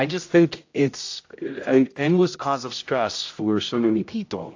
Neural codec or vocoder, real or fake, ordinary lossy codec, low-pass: codec, 16 kHz, 0.5 kbps, X-Codec, HuBERT features, trained on general audio; fake; AAC, 48 kbps; 7.2 kHz